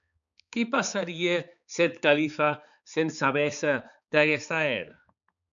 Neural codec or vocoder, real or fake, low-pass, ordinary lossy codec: codec, 16 kHz, 4 kbps, X-Codec, HuBERT features, trained on balanced general audio; fake; 7.2 kHz; MP3, 96 kbps